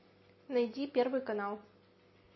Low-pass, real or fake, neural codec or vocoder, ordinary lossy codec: 7.2 kHz; real; none; MP3, 24 kbps